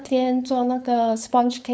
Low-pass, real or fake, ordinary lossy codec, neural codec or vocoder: none; fake; none; codec, 16 kHz, 4.8 kbps, FACodec